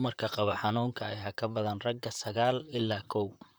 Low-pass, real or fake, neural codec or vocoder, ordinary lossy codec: none; fake; vocoder, 44.1 kHz, 128 mel bands, Pupu-Vocoder; none